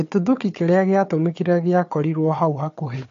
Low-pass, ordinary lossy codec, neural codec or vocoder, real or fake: 7.2 kHz; AAC, 48 kbps; codec, 16 kHz, 4 kbps, FunCodec, trained on Chinese and English, 50 frames a second; fake